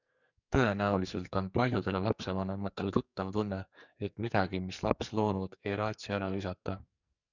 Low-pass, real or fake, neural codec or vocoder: 7.2 kHz; fake; codec, 44.1 kHz, 2.6 kbps, SNAC